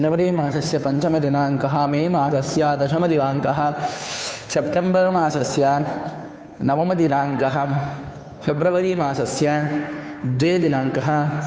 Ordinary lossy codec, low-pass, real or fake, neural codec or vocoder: none; none; fake; codec, 16 kHz, 2 kbps, FunCodec, trained on Chinese and English, 25 frames a second